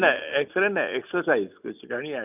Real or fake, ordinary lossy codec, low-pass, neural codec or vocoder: real; none; 3.6 kHz; none